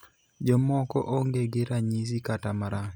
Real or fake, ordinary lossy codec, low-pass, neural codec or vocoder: real; none; none; none